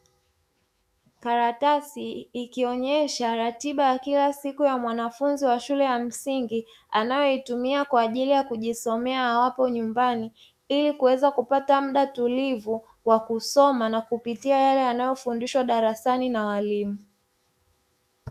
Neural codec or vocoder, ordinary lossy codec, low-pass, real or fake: autoencoder, 48 kHz, 128 numbers a frame, DAC-VAE, trained on Japanese speech; Opus, 64 kbps; 14.4 kHz; fake